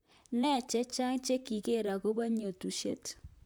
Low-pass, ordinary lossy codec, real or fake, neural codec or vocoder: none; none; fake; vocoder, 44.1 kHz, 128 mel bands, Pupu-Vocoder